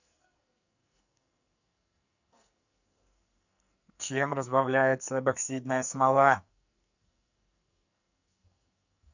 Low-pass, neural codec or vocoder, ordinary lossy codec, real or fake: 7.2 kHz; codec, 44.1 kHz, 2.6 kbps, SNAC; none; fake